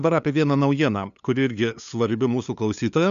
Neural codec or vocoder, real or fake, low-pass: codec, 16 kHz, 2 kbps, FunCodec, trained on LibriTTS, 25 frames a second; fake; 7.2 kHz